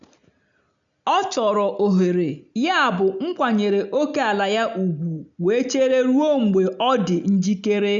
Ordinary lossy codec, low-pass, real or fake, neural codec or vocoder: none; 7.2 kHz; real; none